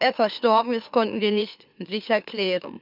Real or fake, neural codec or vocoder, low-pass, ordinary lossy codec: fake; autoencoder, 44.1 kHz, a latent of 192 numbers a frame, MeloTTS; 5.4 kHz; none